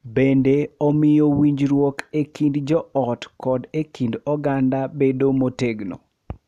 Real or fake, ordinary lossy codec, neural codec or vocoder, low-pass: real; none; none; 14.4 kHz